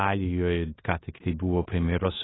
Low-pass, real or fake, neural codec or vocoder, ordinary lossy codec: 7.2 kHz; fake; codec, 16 kHz in and 24 kHz out, 0.9 kbps, LongCat-Audio-Codec, four codebook decoder; AAC, 16 kbps